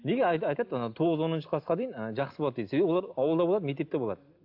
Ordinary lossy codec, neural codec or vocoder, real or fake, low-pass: AAC, 48 kbps; none; real; 5.4 kHz